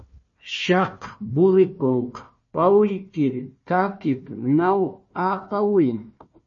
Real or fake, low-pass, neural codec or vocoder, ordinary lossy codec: fake; 7.2 kHz; codec, 16 kHz, 1 kbps, FunCodec, trained on Chinese and English, 50 frames a second; MP3, 32 kbps